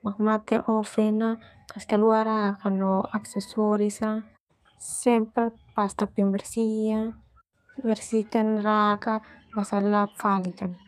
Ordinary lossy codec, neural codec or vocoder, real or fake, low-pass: none; codec, 32 kHz, 1.9 kbps, SNAC; fake; 14.4 kHz